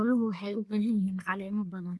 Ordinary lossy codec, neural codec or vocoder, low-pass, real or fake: none; codec, 24 kHz, 1 kbps, SNAC; none; fake